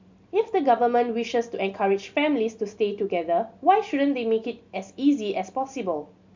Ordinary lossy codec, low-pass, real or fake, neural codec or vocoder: MP3, 64 kbps; 7.2 kHz; real; none